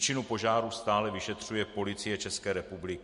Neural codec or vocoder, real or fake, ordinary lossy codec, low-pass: none; real; MP3, 48 kbps; 10.8 kHz